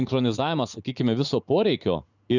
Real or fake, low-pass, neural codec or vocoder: fake; 7.2 kHz; vocoder, 44.1 kHz, 80 mel bands, Vocos